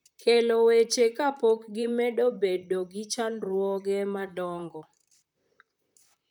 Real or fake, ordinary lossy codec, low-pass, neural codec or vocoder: fake; none; 19.8 kHz; vocoder, 44.1 kHz, 128 mel bands, Pupu-Vocoder